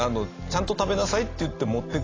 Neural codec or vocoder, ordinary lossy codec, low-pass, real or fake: none; AAC, 32 kbps; 7.2 kHz; real